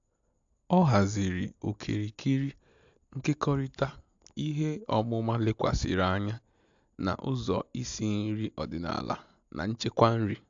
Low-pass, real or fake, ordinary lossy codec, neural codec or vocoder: 7.2 kHz; real; AAC, 64 kbps; none